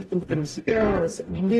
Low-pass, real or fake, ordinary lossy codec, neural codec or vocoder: 19.8 kHz; fake; AAC, 32 kbps; codec, 44.1 kHz, 0.9 kbps, DAC